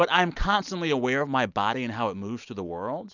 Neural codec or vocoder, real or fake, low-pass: none; real; 7.2 kHz